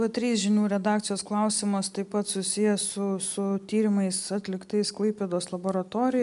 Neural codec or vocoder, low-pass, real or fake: none; 10.8 kHz; real